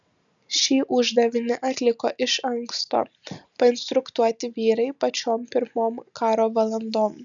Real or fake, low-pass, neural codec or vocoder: real; 7.2 kHz; none